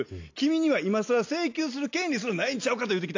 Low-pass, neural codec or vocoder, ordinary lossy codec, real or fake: 7.2 kHz; none; AAC, 48 kbps; real